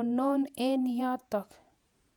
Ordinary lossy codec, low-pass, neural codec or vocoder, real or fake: none; 19.8 kHz; vocoder, 48 kHz, 128 mel bands, Vocos; fake